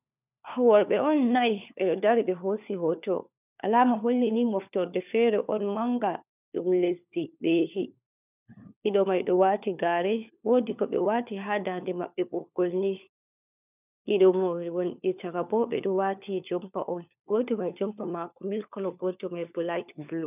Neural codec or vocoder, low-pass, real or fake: codec, 16 kHz, 4 kbps, FunCodec, trained on LibriTTS, 50 frames a second; 3.6 kHz; fake